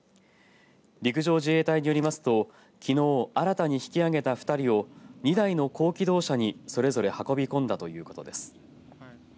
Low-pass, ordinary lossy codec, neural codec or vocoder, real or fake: none; none; none; real